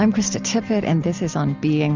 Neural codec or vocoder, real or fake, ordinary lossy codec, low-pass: none; real; Opus, 64 kbps; 7.2 kHz